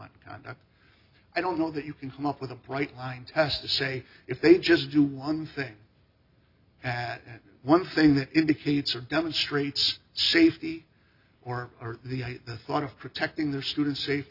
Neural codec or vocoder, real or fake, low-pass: none; real; 5.4 kHz